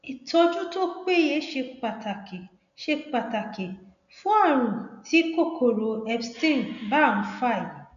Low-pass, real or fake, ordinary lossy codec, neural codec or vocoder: 7.2 kHz; real; none; none